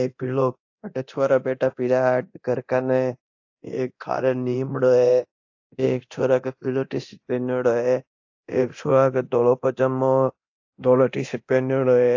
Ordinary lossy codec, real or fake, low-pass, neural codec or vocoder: AAC, 48 kbps; fake; 7.2 kHz; codec, 24 kHz, 0.9 kbps, DualCodec